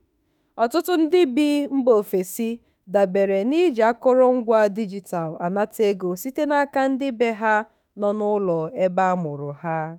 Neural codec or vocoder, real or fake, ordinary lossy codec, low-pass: autoencoder, 48 kHz, 32 numbers a frame, DAC-VAE, trained on Japanese speech; fake; none; 19.8 kHz